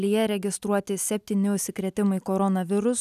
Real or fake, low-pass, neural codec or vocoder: real; 14.4 kHz; none